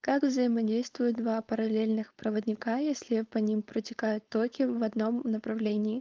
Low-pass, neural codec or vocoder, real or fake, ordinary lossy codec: 7.2 kHz; codec, 16 kHz, 4.8 kbps, FACodec; fake; Opus, 32 kbps